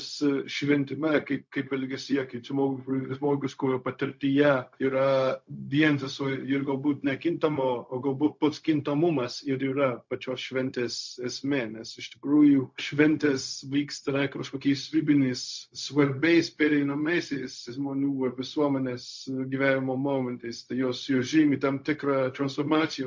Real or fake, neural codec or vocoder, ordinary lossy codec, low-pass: fake; codec, 16 kHz, 0.4 kbps, LongCat-Audio-Codec; MP3, 48 kbps; 7.2 kHz